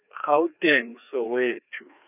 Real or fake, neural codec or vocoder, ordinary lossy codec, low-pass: fake; codec, 16 kHz, 2 kbps, FreqCodec, larger model; none; 3.6 kHz